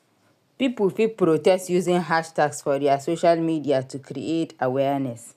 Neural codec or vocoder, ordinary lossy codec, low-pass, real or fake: none; none; 14.4 kHz; real